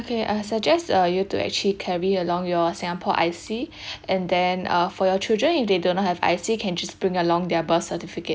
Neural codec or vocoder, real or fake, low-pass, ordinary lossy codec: none; real; none; none